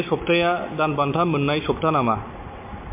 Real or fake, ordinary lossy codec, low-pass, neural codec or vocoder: real; MP3, 24 kbps; 3.6 kHz; none